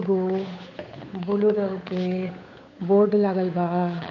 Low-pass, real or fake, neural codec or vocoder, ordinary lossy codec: 7.2 kHz; fake; codec, 16 kHz, 8 kbps, FunCodec, trained on Chinese and English, 25 frames a second; MP3, 48 kbps